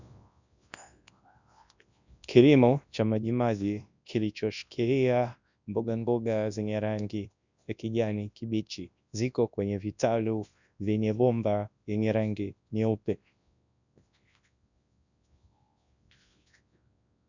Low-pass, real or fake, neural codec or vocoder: 7.2 kHz; fake; codec, 24 kHz, 0.9 kbps, WavTokenizer, large speech release